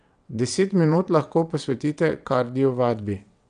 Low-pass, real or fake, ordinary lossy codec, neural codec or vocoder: 9.9 kHz; fake; none; vocoder, 22.05 kHz, 80 mel bands, WaveNeXt